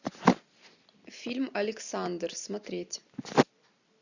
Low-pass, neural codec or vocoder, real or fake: 7.2 kHz; none; real